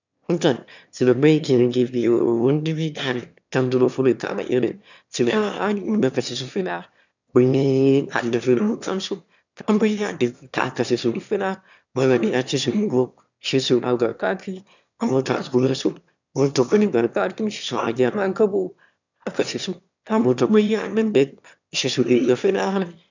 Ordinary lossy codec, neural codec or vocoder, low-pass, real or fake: none; autoencoder, 22.05 kHz, a latent of 192 numbers a frame, VITS, trained on one speaker; 7.2 kHz; fake